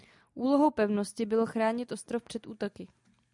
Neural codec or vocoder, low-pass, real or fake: none; 10.8 kHz; real